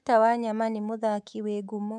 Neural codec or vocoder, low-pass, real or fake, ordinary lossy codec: codec, 24 kHz, 3.1 kbps, DualCodec; none; fake; none